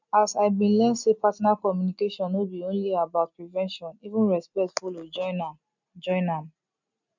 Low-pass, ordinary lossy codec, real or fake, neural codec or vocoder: 7.2 kHz; none; real; none